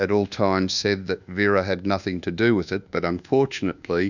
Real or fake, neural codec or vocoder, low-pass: fake; codec, 24 kHz, 1.2 kbps, DualCodec; 7.2 kHz